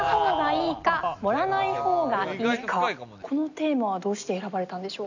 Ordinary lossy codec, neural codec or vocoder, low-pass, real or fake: none; none; 7.2 kHz; real